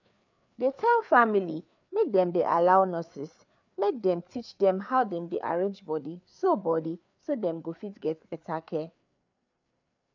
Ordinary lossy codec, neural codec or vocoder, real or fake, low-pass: MP3, 64 kbps; codec, 16 kHz, 4 kbps, FreqCodec, larger model; fake; 7.2 kHz